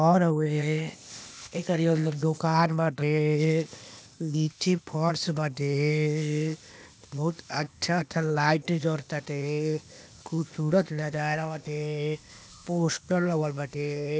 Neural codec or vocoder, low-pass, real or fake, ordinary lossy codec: codec, 16 kHz, 0.8 kbps, ZipCodec; none; fake; none